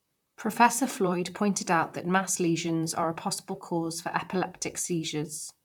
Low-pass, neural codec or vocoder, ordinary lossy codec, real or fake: 19.8 kHz; vocoder, 44.1 kHz, 128 mel bands, Pupu-Vocoder; none; fake